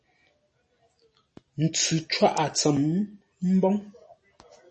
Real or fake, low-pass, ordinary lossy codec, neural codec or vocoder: real; 9.9 kHz; MP3, 32 kbps; none